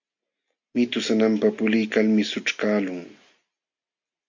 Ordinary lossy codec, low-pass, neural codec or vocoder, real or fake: MP3, 48 kbps; 7.2 kHz; none; real